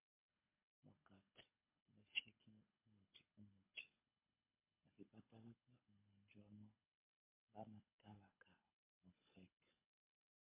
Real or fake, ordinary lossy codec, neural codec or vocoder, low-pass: fake; MP3, 24 kbps; codec, 24 kHz, 6 kbps, HILCodec; 3.6 kHz